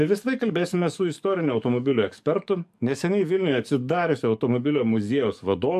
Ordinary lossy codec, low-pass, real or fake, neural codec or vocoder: AAC, 96 kbps; 14.4 kHz; fake; codec, 44.1 kHz, 7.8 kbps, DAC